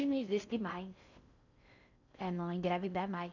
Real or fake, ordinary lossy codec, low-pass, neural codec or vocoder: fake; none; 7.2 kHz; codec, 16 kHz in and 24 kHz out, 0.6 kbps, FocalCodec, streaming, 4096 codes